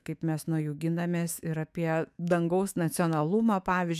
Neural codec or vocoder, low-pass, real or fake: autoencoder, 48 kHz, 128 numbers a frame, DAC-VAE, trained on Japanese speech; 14.4 kHz; fake